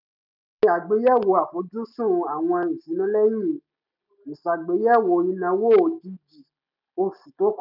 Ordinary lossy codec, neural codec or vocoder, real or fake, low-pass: none; none; real; 5.4 kHz